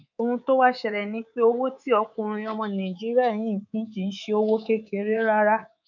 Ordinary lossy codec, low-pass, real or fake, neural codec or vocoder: none; 7.2 kHz; fake; codec, 24 kHz, 3.1 kbps, DualCodec